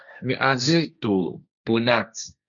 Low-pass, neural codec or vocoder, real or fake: 7.2 kHz; codec, 16 kHz, 1.1 kbps, Voila-Tokenizer; fake